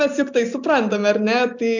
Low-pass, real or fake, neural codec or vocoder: 7.2 kHz; real; none